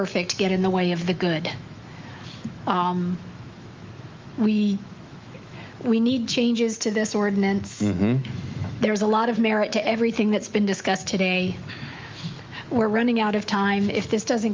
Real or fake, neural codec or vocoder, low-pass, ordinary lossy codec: fake; autoencoder, 48 kHz, 128 numbers a frame, DAC-VAE, trained on Japanese speech; 7.2 kHz; Opus, 32 kbps